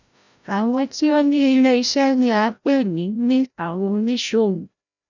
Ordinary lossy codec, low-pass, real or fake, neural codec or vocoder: none; 7.2 kHz; fake; codec, 16 kHz, 0.5 kbps, FreqCodec, larger model